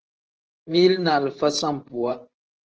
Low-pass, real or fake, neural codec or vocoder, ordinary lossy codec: 7.2 kHz; fake; vocoder, 44.1 kHz, 128 mel bands every 512 samples, BigVGAN v2; Opus, 16 kbps